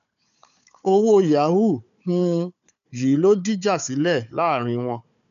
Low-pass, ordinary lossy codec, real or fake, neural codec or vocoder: 7.2 kHz; none; fake; codec, 16 kHz, 4 kbps, FunCodec, trained on Chinese and English, 50 frames a second